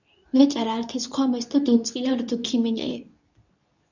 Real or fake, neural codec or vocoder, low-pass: fake; codec, 24 kHz, 0.9 kbps, WavTokenizer, medium speech release version 2; 7.2 kHz